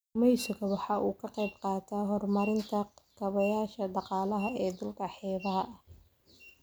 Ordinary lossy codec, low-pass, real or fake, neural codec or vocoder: none; none; real; none